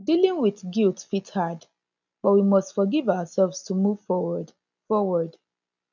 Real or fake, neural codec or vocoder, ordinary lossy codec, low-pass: real; none; none; 7.2 kHz